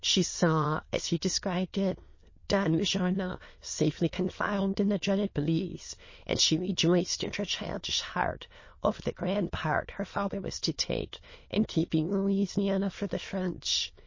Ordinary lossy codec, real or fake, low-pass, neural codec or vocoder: MP3, 32 kbps; fake; 7.2 kHz; autoencoder, 22.05 kHz, a latent of 192 numbers a frame, VITS, trained on many speakers